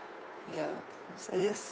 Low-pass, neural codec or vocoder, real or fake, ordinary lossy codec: none; codec, 16 kHz, 2 kbps, FunCodec, trained on Chinese and English, 25 frames a second; fake; none